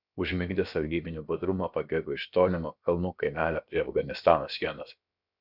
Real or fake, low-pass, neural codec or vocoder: fake; 5.4 kHz; codec, 16 kHz, about 1 kbps, DyCAST, with the encoder's durations